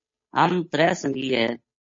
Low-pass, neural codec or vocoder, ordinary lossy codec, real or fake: 7.2 kHz; codec, 16 kHz, 8 kbps, FunCodec, trained on Chinese and English, 25 frames a second; MP3, 32 kbps; fake